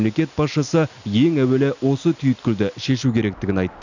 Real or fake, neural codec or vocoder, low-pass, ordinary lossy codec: real; none; 7.2 kHz; none